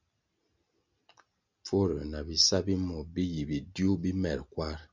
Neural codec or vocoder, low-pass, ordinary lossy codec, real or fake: none; 7.2 kHz; MP3, 64 kbps; real